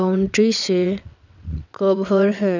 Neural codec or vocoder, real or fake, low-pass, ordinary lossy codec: vocoder, 22.05 kHz, 80 mel bands, WaveNeXt; fake; 7.2 kHz; none